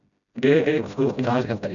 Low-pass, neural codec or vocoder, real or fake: 7.2 kHz; codec, 16 kHz, 0.5 kbps, FreqCodec, smaller model; fake